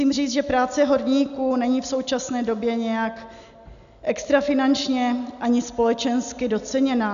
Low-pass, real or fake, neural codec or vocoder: 7.2 kHz; real; none